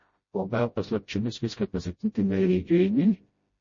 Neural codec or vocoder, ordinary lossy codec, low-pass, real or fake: codec, 16 kHz, 0.5 kbps, FreqCodec, smaller model; MP3, 32 kbps; 7.2 kHz; fake